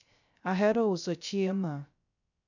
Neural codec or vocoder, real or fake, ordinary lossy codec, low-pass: codec, 16 kHz, 0.3 kbps, FocalCodec; fake; none; 7.2 kHz